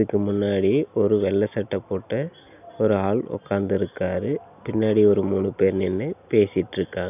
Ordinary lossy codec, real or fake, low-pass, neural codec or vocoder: none; real; 3.6 kHz; none